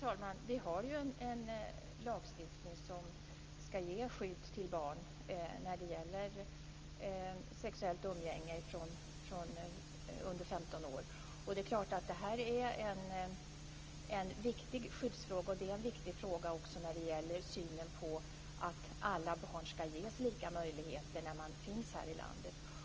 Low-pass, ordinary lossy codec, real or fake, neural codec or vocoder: 7.2 kHz; Opus, 24 kbps; real; none